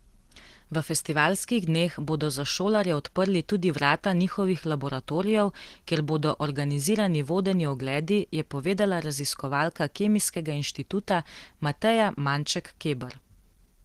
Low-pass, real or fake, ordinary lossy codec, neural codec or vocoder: 14.4 kHz; real; Opus, 16 kbps; none